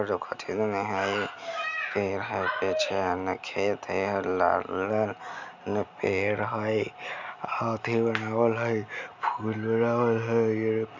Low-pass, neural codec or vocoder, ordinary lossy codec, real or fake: 7.2 kHz; none; none; real